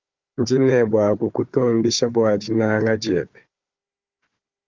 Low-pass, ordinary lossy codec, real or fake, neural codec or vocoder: 7.2 kHz; Opus, 24 kbps; fake; codec, 16 kHz, 4 kbps, FunCodec, trained on Chinese and English, 50 frames a second